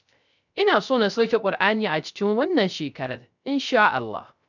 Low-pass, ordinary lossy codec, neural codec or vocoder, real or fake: 7.2 kHz; none; codec, 16 kHz, 0.3 kbps, FocalCodec; fake